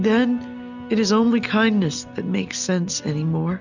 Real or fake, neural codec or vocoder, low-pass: real; none; 7.2 kHz